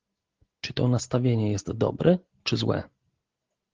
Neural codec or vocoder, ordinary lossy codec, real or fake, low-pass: none; Opus, 16 kbps; real; 7.2 kHz